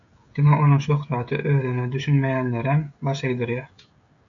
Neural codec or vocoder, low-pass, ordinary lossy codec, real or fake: codec, 16 kHz, 16 kbps, FreqCodec, smaller model; 7.2 kHz; AAC, 64 kbps; fake